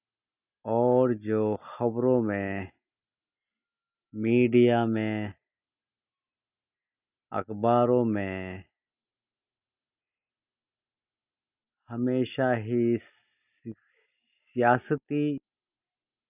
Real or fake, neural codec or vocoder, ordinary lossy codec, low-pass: real; none; none; 3.6 kHz